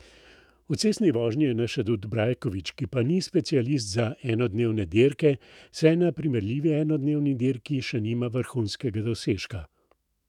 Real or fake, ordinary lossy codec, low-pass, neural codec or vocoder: fake; none; 19.8 kHz; autoencoder, 48 kHz, 128 numbers a frame, DAC-VAE, trained on Japanese speech